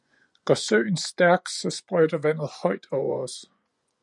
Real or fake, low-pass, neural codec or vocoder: fake; 10.8 kHz; vocoder, 24 kHz, 100 mel bands, Vocos